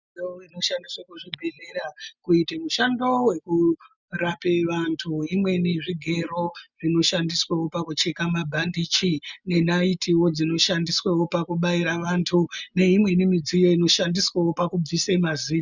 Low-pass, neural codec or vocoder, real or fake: 7.2 kHz; none; real